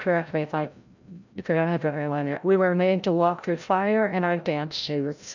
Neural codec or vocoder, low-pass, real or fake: codec, 16 kHz, 0.5 kbps, FreqCodec, larger model; 7.2 kHz; fake